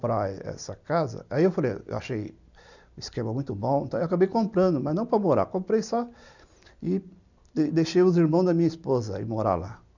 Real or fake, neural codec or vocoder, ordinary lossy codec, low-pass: real; none; none; 7.2 kHz